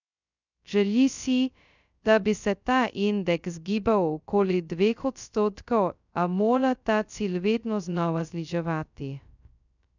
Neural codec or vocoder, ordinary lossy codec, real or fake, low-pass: codec, 16 kHz, 0.2 kbps, FocalCodec; none; fake; 7.2 kHz